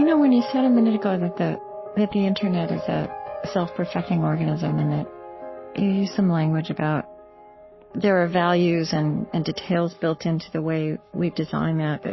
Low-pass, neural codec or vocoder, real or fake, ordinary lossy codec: 7.2 kHz; codec, 44.1 kHz, 7.8 kbps, Pupu-Codec; fake; MP3, 24 kbps